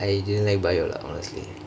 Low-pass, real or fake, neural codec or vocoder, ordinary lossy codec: none; real; none; none